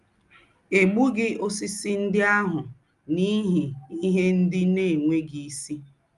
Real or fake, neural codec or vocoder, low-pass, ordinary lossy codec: real; none; 10.8 kHz; Opus, 32 kbps